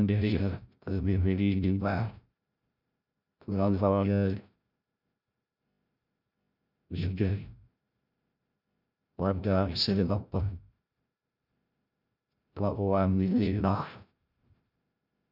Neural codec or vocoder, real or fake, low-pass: codec, 16 kHz, 0.5 kbps, FreqCodec, larger model; fake; 5.4 kHz